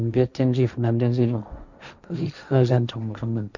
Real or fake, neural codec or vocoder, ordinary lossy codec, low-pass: fake; codec, 16 kHz, 1.1 kbps, Voila-Tokenizer; none; 7.2 kHz